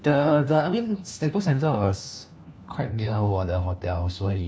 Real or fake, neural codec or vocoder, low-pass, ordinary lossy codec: fake; codec, 16 kHz, 1 kbps, FunCodec, trained on LibriTTS, 50 frames a second; none; none